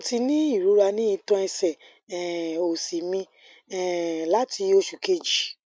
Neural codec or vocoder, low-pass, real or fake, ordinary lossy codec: none; none; real; none